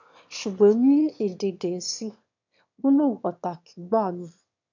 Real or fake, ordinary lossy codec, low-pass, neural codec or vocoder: fake; none; 7.2 kHz; autoencoder, 22.05 kHz, a latent of 192 numbers a frame, VITS, trained on one speaker